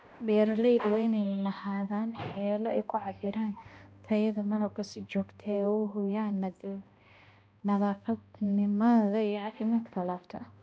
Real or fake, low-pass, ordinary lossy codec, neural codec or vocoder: fake; none; none; codec, 16 kHz, 1 kbps, X-Codec, HuBERT features, trained on balanced general audio